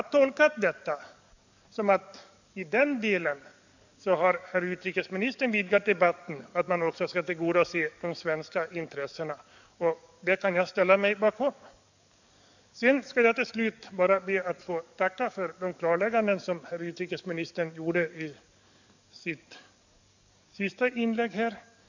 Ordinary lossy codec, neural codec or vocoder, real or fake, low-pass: none; codec, 44.1 kHz, 7.8 kbps, DAC; fake; 7.2 kHz